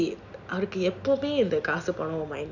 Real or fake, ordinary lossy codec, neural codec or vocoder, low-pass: real; none; none; 7.2 kHz